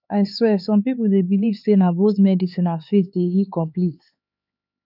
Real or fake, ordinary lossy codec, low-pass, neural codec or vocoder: fake; none; 5.4 kHz; codec, 16 kHz, 4 kbps, X-Codec, HuBERT features, trained on LibriSpeech